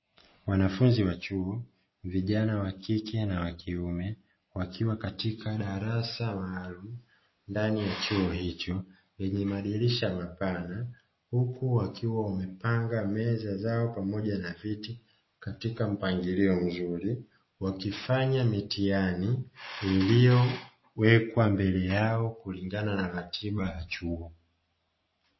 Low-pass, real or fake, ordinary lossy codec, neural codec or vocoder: 7.2 kHz; real; MP3, 24 kbps; none